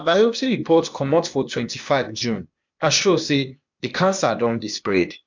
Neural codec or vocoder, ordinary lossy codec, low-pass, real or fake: codec, 16 kHz, 0.8 kbps, ZipCodec; MP3, 64 kbps; 7.2 kHz; fake